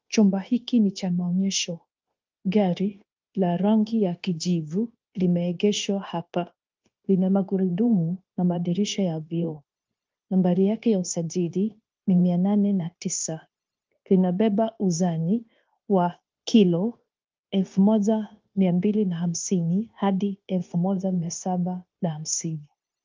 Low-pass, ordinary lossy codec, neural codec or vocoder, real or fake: 7.2 kHz; Opus, 24 kbps; codec, 16 kHz, 0.9 kbps, LongCat-Audio-Codec; fake